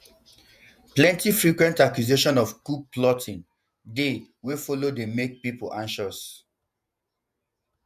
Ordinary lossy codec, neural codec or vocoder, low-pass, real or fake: none; vocoder, 48 kHz, 128 mel bands, Vocos; 14.4 kHz; fake